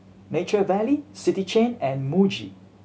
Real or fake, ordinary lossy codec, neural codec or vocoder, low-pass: real; none; none; none